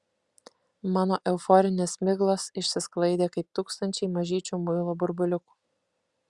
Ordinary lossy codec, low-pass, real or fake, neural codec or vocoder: Opus, 64 kbps; 10.8 kHz; real; none